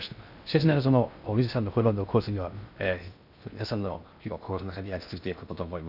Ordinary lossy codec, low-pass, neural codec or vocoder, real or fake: none; 5.4 kHz; codec, 16 kHz in and 24 kHz out, 0.6 kbps, FocalCodec, streaming, 2048 codes; fake